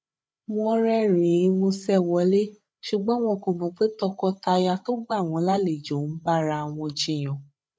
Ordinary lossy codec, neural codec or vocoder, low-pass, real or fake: none; codec, 16 kHz, 8 kbps, FreqCodec, larger model; none; fake